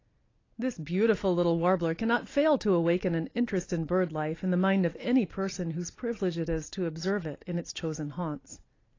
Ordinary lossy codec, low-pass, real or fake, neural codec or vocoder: AAC, 32 kbps; 7.2 kHz; real; none